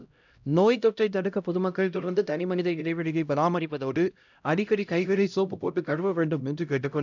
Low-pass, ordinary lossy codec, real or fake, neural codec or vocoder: 7.2 kHz; none; fake; codec, 16 kHz, 0.5 kbps, X-Codec, HuBERT features, trained on LibriSpeech